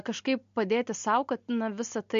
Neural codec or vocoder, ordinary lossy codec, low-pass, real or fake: none; MP3, 48 kbps; 7.2 kHz; real